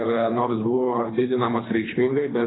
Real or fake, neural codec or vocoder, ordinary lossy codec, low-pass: fake; codec, 24 kHz, 3 kbps, HILCodec; AAC, 16 kbps; 7.2 kHz